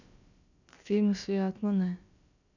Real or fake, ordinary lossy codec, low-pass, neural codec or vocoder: fake; none; 7.2 kHz; codec, 16 kHz, about 1 kbps, DyCAST, with the encoder's durations